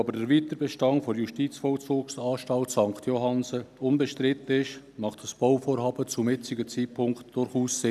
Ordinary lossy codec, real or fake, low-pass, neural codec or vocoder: none; real; 14.4 kHz; none